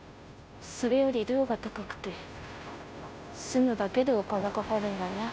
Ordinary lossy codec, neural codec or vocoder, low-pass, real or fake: none; codec, 16 kHz, 0.5 kbps, FunCodec, trained on Chinese and English, 25 frames a second; none; fake